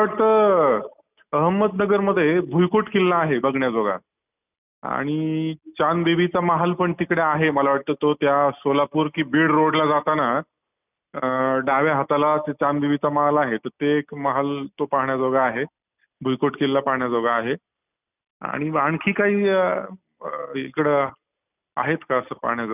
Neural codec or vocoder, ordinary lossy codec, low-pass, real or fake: none; none; 3.6 kHz; real